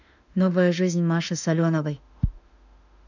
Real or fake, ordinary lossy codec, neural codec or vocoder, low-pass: fake; none; autoencoder, 48 kHz, 32 numbers a frame, DAC-VAE, trained on Japanese speech; 7.2 kHz